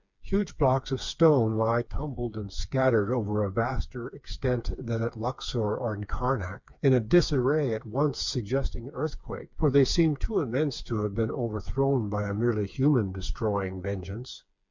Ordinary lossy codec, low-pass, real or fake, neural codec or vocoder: MP3, 64 kbps; 7.2 kHz; fake; codec, 16 kHz, 4 kbps, FreqCodec, smaller model